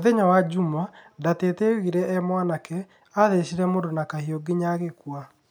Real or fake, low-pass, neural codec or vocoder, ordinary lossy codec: real; none; none; none